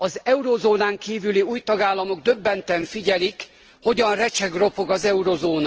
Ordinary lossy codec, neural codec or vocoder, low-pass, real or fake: Opus, 16 kbps; none; 7.2 kHz; real